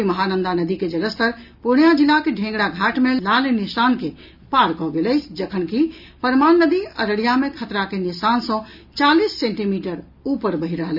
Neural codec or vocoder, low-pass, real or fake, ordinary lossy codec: none; 5.4 kHz; real; none